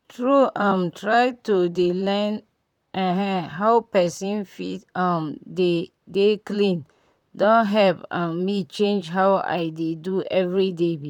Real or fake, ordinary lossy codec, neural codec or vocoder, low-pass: fake; none; vocoder, 44.1 kHz, 128 mel bands, Pupu-Vocoder; 19.8 kHz